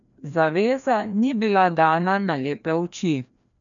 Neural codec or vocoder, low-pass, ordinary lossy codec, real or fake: codec, 16 kHz, 1 kbps, FreqCodec, larger model; 7.2 kHz; none; fake